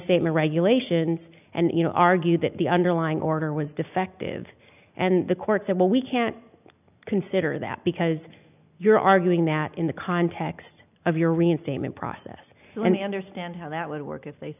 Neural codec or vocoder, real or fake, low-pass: none; real; 3.6 kHz